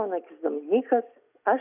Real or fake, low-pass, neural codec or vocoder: real; 3.6 kHz; none